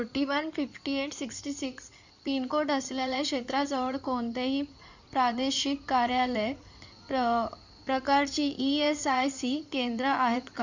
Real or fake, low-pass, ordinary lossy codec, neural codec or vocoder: fake; 7.2 kHz; none; codec, 16 kHz in and 24 kHz out, 2.2 kbps, FireRedTTS-2 codec